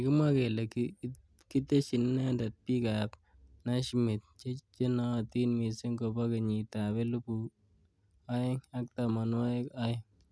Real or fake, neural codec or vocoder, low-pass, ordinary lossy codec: real; none; none; none